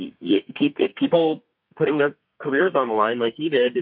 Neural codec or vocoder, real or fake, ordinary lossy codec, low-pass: codec, 32 kHz, 1.9 kbps, SNAC; fake; MP3, 48 kbps; 5.4 kHz